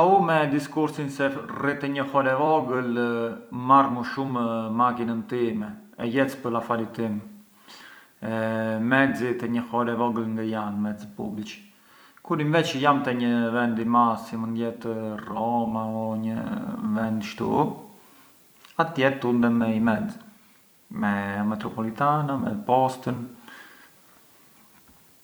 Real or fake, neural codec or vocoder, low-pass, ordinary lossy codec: fake; vocoder, 44.1 kHz, 128 mel bands every 256 samples, BigVGAN v2; none; none